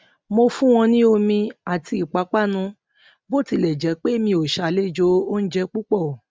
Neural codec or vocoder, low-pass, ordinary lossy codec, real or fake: none; none; none; real